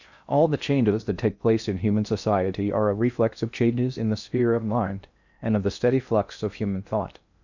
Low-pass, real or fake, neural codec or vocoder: 7.2 kHz; fake; codec, 16 kHz in and 24 kHz out, 0.6 kbps, FocalCodec, streaming, 2048 codes